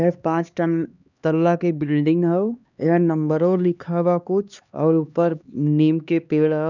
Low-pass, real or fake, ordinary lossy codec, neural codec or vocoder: 7.2 kHz; fake; none; codec, 16 kHz, 2 kbps, X-Codec, HuBERT features, trained on LibriSpeech